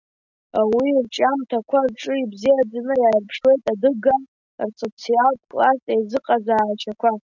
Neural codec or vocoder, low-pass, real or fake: none; 7.2 kHz; real